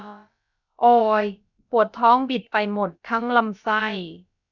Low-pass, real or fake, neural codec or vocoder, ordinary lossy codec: 7.2 kHz; fake; codec, 16 kHz, about 1 kbps, DyCAST, with the encoder's durations; none